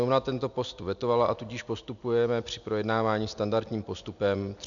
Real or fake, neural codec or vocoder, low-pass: real; none; 7.2 kHz